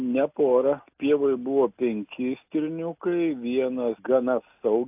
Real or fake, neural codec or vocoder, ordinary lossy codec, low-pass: real; none; AAC, 32 kbps; 3.6 kHz